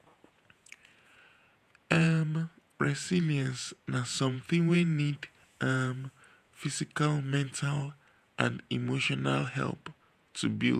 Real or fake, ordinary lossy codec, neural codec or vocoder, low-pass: fake; none; vocoder, 48 kHz, 128 mel bands, Vocos; 14.4 kHz